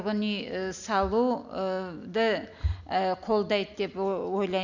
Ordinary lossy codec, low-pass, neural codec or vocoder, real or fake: none; 7.2 kHz; none; real